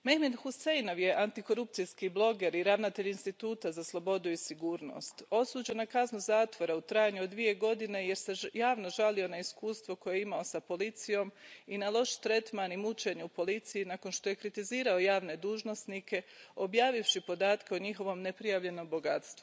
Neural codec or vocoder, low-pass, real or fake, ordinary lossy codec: none; none; real; none